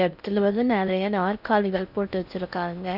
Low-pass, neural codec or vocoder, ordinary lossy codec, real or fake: 5.4 kHz; codec, 16 kHz in and 24 kHz out, 0.6 kbps, FocalCodec, streaming, 2048 codes; none; fake